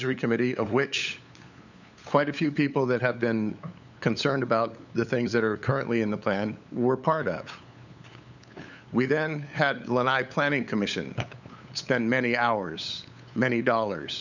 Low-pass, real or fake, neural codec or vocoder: 7.2 kHz; fake; codec, 16 kHz, 8 kbps, FunCodec, trained on LibriTTS, 25 frames a second